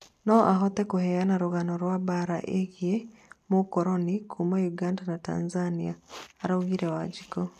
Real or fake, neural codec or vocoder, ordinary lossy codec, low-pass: real; none; none; 14.4 kHz